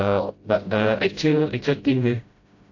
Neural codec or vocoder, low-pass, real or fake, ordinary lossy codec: codec, 16 kHz, 0.5 kbps, FreqCodec, smaller model; 7.2 kHz; fake; AAC, 48 kbps